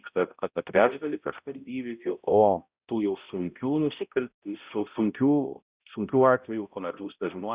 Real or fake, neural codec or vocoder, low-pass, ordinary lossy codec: fake; codec, 16 kHz, 0.5 kbps, X-Codec, HuBERT features, trained on balanced general audio; 3.6 kHz; Opus, 64 kbps